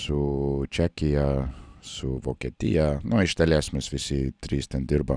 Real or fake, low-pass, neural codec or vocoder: real; 9.9 kHz; none